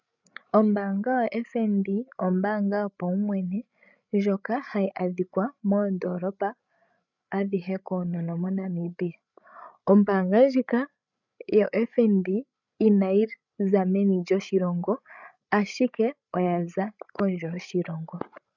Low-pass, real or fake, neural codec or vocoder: 7.2 kHz; fake; codec, 16 kHz, 16 kbps, FreqCodec, larger model